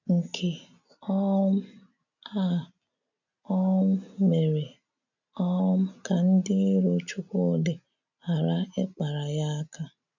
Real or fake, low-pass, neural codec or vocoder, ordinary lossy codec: real; 7.2 kHz; none; none